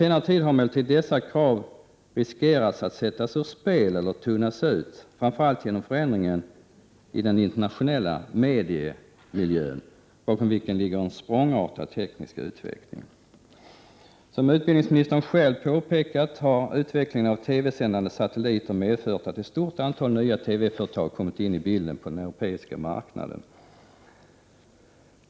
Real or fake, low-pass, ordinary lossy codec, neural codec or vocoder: real; none; none; none